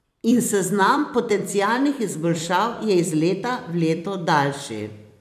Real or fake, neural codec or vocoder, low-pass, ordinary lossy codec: fake; vocoder, 44.1 kHz, 128 mel bands every 256 samples, BigVGAN v2; 14.4 kHz; none